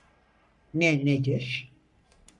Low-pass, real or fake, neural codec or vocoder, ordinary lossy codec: 10.8 kHz; fake; codec, 44.1 kHz, 3.4 kbps, Pupu-Codec; MP3, 96 kbps